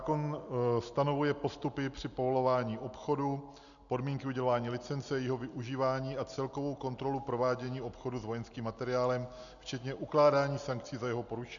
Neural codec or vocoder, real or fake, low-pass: none; real; 7.2 kHz